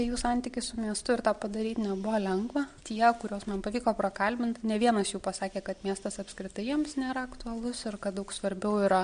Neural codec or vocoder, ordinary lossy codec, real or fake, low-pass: none; MP3, 64 kbps; real; 9.9 kHz